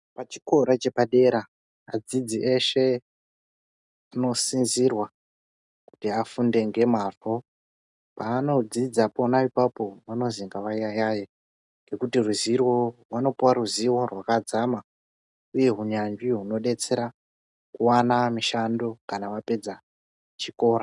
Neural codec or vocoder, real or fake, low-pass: none; real; 10.8 kHz